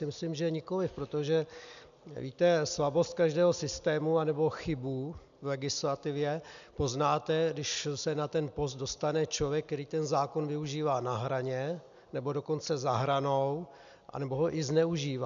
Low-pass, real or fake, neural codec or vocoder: 7.2 kHz; real; none